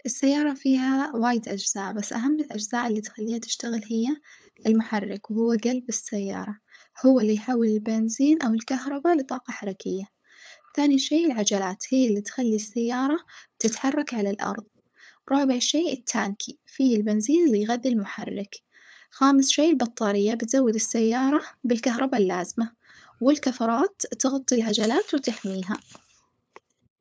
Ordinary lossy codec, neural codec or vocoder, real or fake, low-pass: none; codec, 16 kHz, 8 kbps, FunCodec, trained on LibriTTS, 25 frames a second; fake; none